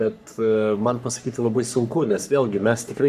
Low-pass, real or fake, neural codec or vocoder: 14.4 kHz; fake; codec, 44.1 kHz, 3.4 kbps, Pupu-Codec